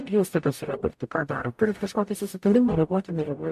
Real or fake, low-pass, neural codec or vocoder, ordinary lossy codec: fake; 14.4 kHz; codec, 44.1 kHz, 0.9 kbps, DAC; MP3, 64 kbps